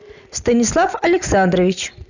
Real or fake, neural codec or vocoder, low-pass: real; none; 7.2 kHz